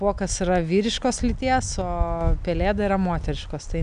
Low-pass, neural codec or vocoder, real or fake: 9.9 kHz; none; real